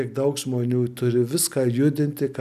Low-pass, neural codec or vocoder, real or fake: 14.4 kHz; none; real